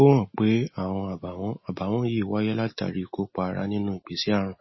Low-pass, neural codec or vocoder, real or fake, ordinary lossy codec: 7.2 kHz; none; real; MP3, 24 kbps